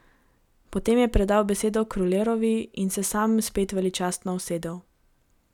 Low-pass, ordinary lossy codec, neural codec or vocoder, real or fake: 19.8 kHz; none; none; real